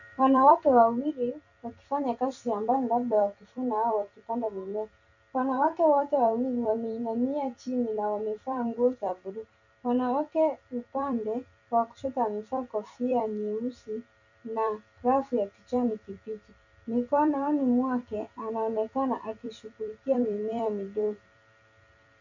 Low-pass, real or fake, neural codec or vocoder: 7.2 kHz; fake; vocoder, 44.1 kHz, 128 mel bands every 256 samples, BigVGAN v2